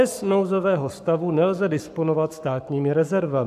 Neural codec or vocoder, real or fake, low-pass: codec, 44.1 kHz, 7.8 kbps, Pupu-Codec; fake; 14.4 kHz